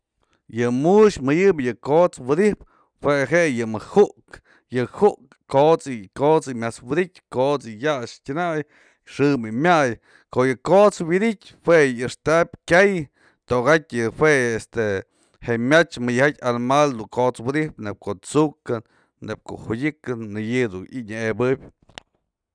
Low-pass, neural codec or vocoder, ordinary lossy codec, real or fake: 9.9 kHz; none; none; real